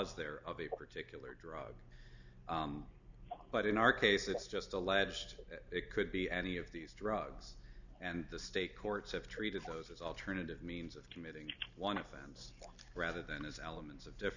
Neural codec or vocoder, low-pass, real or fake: none; 7.2 kHz; real